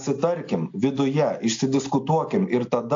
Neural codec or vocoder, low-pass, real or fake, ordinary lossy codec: none; 7.2 kHz; real; AAC, 48 kbps